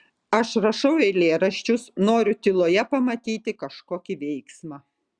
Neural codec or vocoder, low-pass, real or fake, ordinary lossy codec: none; 9.9 kHz; real; Opus, 64 kbps